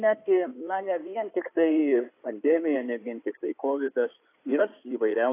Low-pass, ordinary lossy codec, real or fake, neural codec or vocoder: 3.6 kHz; AAC, 24 kbps; fake; codec, 16 kHz in and 24 kHz out, 2.2 kbps, FireRedTTS-2 codec